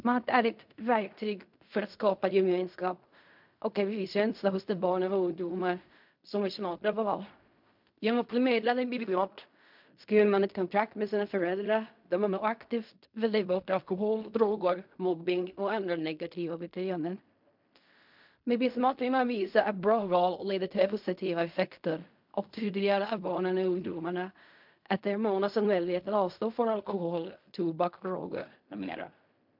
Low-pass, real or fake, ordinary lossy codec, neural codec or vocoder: 5.4 kHz; fake; MP3, 48 kbps; codec, 16 kHz in and 24 kHz out, 0.4 kbps, LongCat-Audio-Codec, fine tuned four codebook decoder